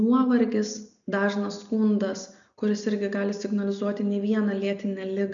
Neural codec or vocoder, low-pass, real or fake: none; 7.2 kHz; real